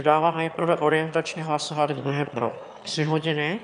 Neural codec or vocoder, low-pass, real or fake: autoencoder, 22.05 kHz, a latent of 192 numbers a frame, VITS, trained on one speaker; 9.9 kHz; fake